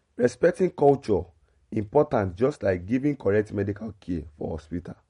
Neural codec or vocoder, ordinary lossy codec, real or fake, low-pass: none; MP3, 48 kbps; real; 10.8 kHz